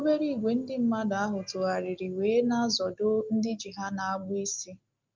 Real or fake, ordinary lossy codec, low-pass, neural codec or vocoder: real; Opus, 32 kbps; 7.2 kHz; none